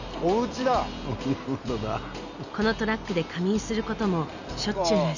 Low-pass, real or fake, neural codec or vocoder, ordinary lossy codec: 7.2 kHz; real; none; none